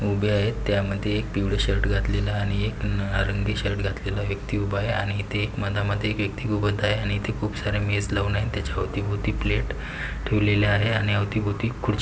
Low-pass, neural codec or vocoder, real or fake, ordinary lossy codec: none; none; real; none